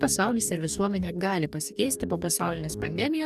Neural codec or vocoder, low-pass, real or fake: codec, 44.1 kHz, 2.6 kbps, DAC; 14.4 kHz; fake